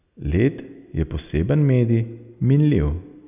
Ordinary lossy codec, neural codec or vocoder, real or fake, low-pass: none; none; real; 3.6 kHz